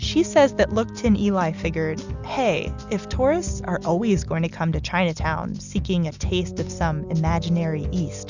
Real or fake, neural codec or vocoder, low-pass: real; none; 7.2 kHz